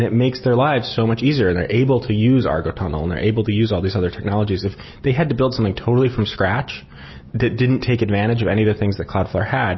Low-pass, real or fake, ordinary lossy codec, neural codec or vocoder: 7.2 kHz; real; MP3, 24 kbps; none